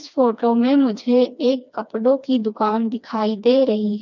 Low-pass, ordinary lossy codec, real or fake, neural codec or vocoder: 7.2 kHz; none; fake; codec, 16 kHz, 2 kbps, FreqCodec, smaller model